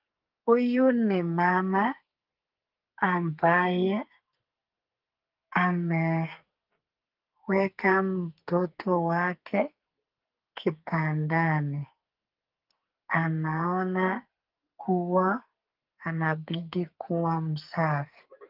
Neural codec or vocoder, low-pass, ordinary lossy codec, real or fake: codec, 44.1 kHz, 2.6 kbps, SNAC; 5.4 kHz; Opus, 32 kbps; fake